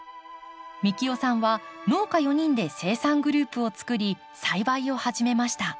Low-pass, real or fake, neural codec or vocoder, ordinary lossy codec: none; real; none; none